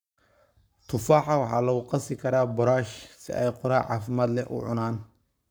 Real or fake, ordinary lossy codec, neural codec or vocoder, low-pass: fake; none; codec, 44.1 kHz, 7.8 kbps, Pupu-Codec; none